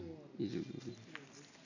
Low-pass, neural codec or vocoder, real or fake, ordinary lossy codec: 7.2 kHz; none; real; none